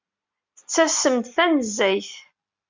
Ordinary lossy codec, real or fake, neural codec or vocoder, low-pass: MP3, 64 kbps; real; none; 7.2 kHz